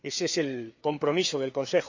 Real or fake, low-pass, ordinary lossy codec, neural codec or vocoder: fake; 7.2 kHz; AAC, 48 kbps; codec, 16 kHz, 4 kbps, FreqCodec, larger model